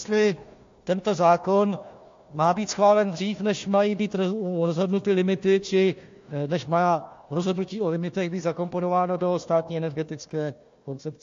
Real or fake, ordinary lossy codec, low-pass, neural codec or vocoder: fake; AAC, 48 kbps; 7.2 kHz; codec, 16 kHz, 1 kbps, FunCodec, trained on Chinese and English, 50 frames a second